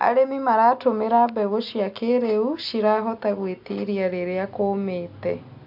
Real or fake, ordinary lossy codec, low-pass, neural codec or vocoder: real; AAC, 48 kbps; 5.4 kHz; none